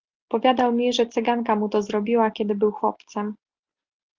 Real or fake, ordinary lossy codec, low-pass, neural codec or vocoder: real; Opus, 32 kbps; 7.2 kHz; none